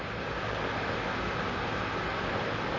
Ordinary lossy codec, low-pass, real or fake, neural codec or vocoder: none; 7.2 kHz; fake; vocoder, 44.1 kHz, 128 mel bands, Pupu-Vocoder